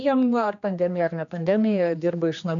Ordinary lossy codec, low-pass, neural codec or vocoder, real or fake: MP3, 96 kbps; 7.2 kHz; codec, 16 kHz, 2 kbps, X-Codec, HuBERT features, trained on general audio; fake